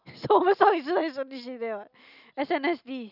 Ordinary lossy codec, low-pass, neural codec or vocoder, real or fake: none; 5.4 kHz; none; real